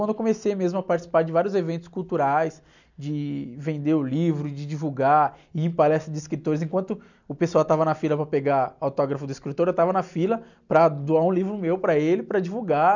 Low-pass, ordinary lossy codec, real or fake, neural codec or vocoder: 7.2 kHz; none; real; none